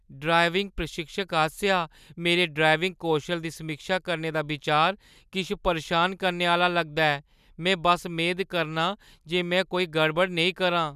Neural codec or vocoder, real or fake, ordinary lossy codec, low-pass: none; real; none; 14.4 kHz